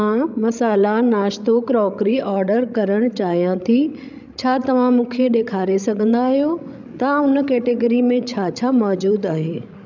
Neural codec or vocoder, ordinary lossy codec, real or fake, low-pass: codec, 16 kHz, 16 kbps, FreqCodec, larger model; none; fake; 7.2 kHz